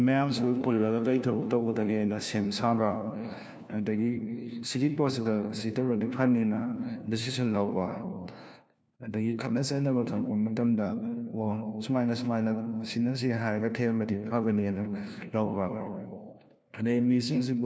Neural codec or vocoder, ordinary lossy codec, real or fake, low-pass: codec, 16 kHz, 1 kbps, FunCodec, trained on LibriTTS, 50 frames a second; none; fake; none